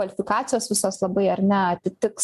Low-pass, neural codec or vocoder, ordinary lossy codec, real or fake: 14.4 kHz; none; MP3, 96 kbps; real